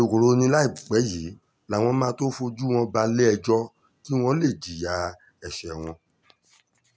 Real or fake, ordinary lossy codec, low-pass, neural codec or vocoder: real; none; none; none